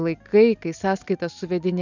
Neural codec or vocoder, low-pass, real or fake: none; 7.2 kHz; real